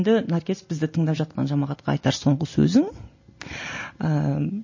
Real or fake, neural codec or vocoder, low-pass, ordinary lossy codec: real; none; 7.2 kHz; MP3, 32 kbps